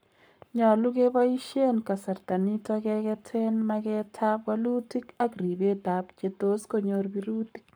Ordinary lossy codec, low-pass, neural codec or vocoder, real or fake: none; none; codec, 44.1 kHz, 7.8 kbps, Pupu-Codec; fake